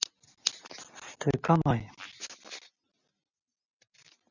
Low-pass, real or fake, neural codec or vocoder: 7.2 kHz; real; none